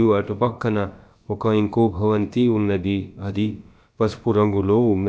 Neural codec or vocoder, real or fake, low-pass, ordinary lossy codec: codec, 16 kHz, about 1 kbps, DyCAST, with the encoder's durations; fake; none; none